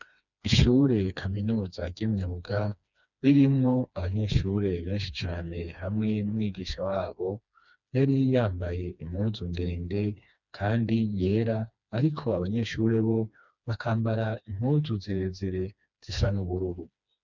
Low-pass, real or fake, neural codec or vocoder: 7.2 kHz; fake; codec, 16 kHz, 2 kbps, FreqCodec, smaller model